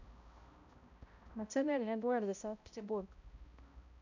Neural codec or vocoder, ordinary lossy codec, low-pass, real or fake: codec, 16 kHz, 0.5 kbps, X-Codec, HuBERT features, trained on balanced general audio; none; 7.2 kHz; fake